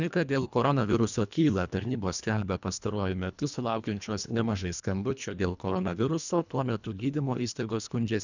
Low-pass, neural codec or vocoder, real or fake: 7.2 kHz; codec, 24 kHz, 1.5 kbps, HILCodec; fake